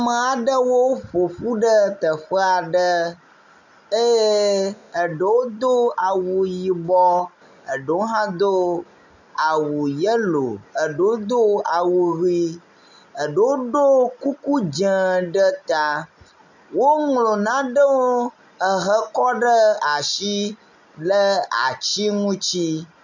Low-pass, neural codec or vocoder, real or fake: 7.2 kHz; none; real